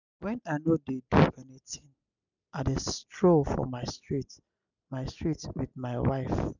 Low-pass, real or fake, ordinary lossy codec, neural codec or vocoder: 7.2 kHz; real; none; none